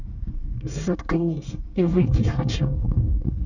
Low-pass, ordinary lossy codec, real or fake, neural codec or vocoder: 7.2 kHz; none; fake; codec, 24 kHz, 1 kbps, SNAC